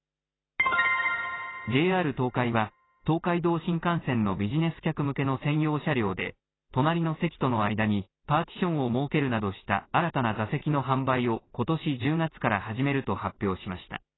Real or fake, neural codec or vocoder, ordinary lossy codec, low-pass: real; none; AAC, 16 kbps; 7.2 kHz